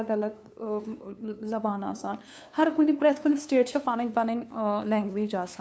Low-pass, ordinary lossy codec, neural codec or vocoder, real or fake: none; none; codec, 16 kHz, 2 kbps, FunCodec, trained on LibriTTS, 25 frames a second; fake